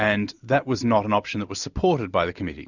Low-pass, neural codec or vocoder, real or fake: 7.2 kHz; none; real